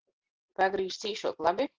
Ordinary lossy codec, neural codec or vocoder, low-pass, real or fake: Opus, 16 kbps; vocoder, 44.1 kHz, 128 mel bands every 512 samples, BigVGAN v2; 7.2 kHz; fake